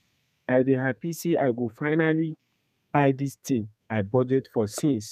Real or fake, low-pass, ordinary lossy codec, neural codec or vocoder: fake; 14.4 kHz; none; codec, 32 kHz, 1.9 kbps, SNAC